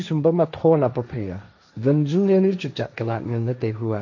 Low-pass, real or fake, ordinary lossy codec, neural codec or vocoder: 7.2 kHz; fake; none; codec, 16 kHz, 1.1 kbps, Voila-Tokenizer